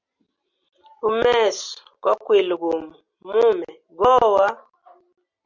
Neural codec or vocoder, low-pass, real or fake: none; 7.2 kHz; real